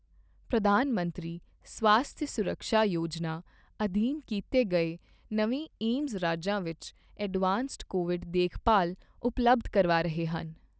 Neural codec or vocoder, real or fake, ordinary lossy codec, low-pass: none; real; none; none